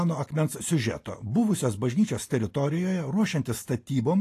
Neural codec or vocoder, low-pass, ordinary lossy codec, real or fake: none; 14.4 kHz; AAC, 48 kbps; real